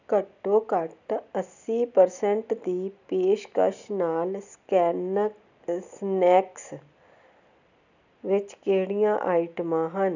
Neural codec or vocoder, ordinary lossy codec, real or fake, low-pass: none; none; real; 7.2 kHz